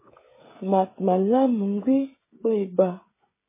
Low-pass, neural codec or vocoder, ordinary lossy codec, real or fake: 3.6 kHz; codec, 16 kHz, 16 kbps, FreqCodec, smaller model; MP3, 16 kbps; fake